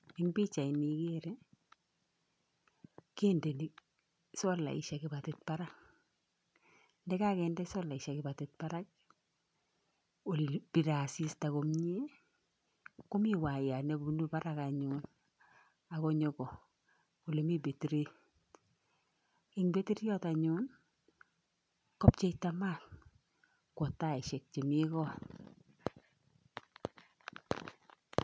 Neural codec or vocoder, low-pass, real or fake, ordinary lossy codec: none; none; real; none